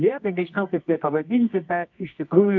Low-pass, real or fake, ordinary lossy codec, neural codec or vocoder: 7.2 kHz; fake; MP3, 64 kbps; codec, 24 kHz, 0.9 kbps, WavTokenizer, medium music audio release